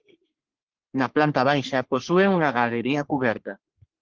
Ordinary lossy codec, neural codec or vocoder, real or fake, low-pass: Opus, 16 kbps; codec, 44.1 kHz, 3.4 kbps, Pupu-Codec; fake; 7.2 kHz